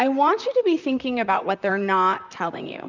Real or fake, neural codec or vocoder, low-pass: fake; vocoder, 44.1 kHz, 128 mel bands, Pupu-Vocoder; 7.2 kHz